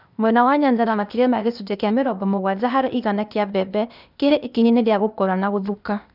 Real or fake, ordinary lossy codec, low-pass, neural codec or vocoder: fake; none; 5.4 kHz; codec, 16 kHz, 0.8 kbps, ZipCodec